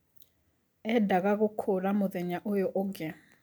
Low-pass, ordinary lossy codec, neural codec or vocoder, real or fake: none; none; none; real